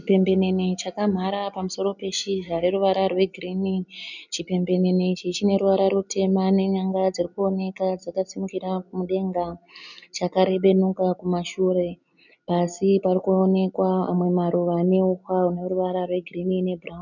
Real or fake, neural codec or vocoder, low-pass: real; none; 7.2 kHz